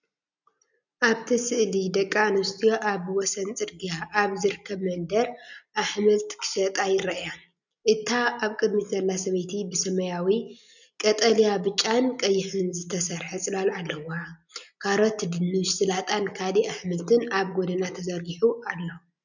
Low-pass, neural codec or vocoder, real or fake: 7.2 kHz; none; real